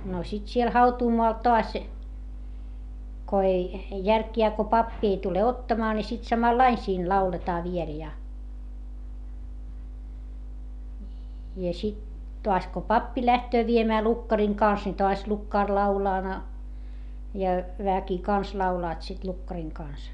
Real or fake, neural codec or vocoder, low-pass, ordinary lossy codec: real; none; 10.8 kHz; none